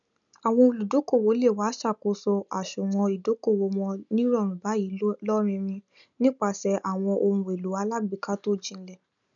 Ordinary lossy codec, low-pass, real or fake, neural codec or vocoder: none; 7.2 kHz; real; none